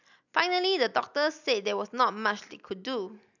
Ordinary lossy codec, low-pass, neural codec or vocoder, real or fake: none; 7.2 kHz; none; real